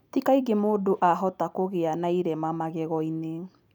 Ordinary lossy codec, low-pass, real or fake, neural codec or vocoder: none; 19.8 kHz; real; none